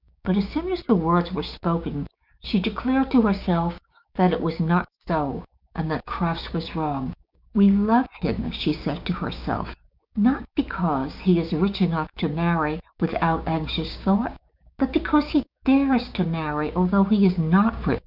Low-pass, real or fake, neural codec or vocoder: 5.4 kHz; fake; codec, 16 kHz, 6 kbps, DAC